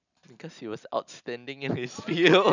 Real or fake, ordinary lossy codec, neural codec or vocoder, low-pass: real; none; none; 7.2 kHz